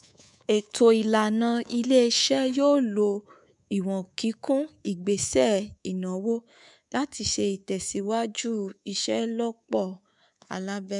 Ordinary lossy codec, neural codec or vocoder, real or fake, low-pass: none; codec, 24 kHz, 3.1 kbps, DualCodec; fake; 10.8 kHz